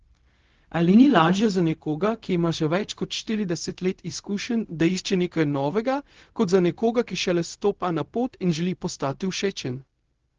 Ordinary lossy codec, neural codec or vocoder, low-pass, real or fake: Opus, 16 kbps; codec, 16 kHz, 0.4 kbps, LongCat-Audio-Codec; 7.2 kHz; fake